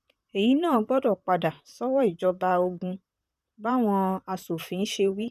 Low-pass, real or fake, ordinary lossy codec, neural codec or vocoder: 14.4 kHz; fake; none; vocoder, 44.1 kHz, 128 mel bands, Pupu-Vocoder